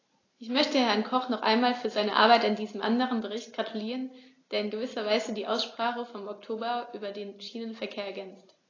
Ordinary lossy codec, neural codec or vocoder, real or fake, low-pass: AAC, 32 kbps; none; real; 7.2 kHz